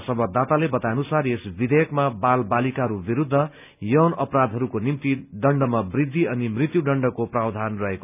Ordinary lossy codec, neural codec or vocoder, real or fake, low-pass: none; none; real; 3.6 kHz